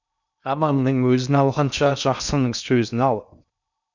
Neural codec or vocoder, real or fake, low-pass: codec, 16 kHz in and 24 kHz out, 0.6 kbps, FocalCodec, streaming, 2048 codes; fake; 7.2 kHz